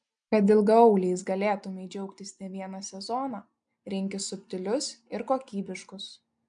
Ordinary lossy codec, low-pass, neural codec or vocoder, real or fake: MP3, 96 kbps; 9.9 kHz; none; real